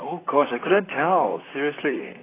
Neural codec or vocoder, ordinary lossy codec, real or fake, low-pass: vocoder, 44.1 kHz, 128 mel bands, Pupu-Vocoder; AAC, 16 kbps; fake; 3.6 kHz